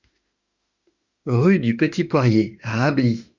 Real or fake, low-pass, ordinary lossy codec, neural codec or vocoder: fake; 7.2 kHz; Opus, 64 kbps; autoencoder, 48 kHz, 32 numbers a frame, DAC-VAE, trained on Japanese speech